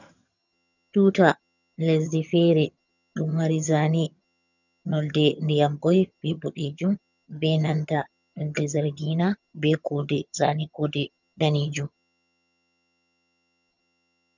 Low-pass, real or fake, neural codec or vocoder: 7.2 kHz; fake; vocoder, 22.05 kHz, 80 mel bands, HiFi-GAN